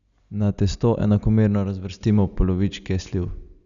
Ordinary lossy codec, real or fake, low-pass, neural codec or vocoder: none; real; 7.2 kHz; none